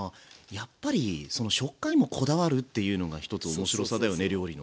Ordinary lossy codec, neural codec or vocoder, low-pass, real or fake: none; none; none; real